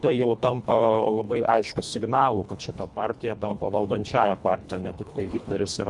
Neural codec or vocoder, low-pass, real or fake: codec, 24 kHz, 1.5 kbps, HILCodec; 10.8 kHz; fake